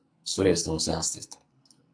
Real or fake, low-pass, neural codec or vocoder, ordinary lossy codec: fake; 9.9 kHz; codec, 44.1 kHz, 2.6 kbps, SNAC; MP3, 96 kbps